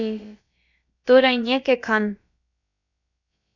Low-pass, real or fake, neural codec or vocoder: 7.2 kHz; fake; codec, 16 kHz, about 1 kbps, DyCAST, with the encoder's durations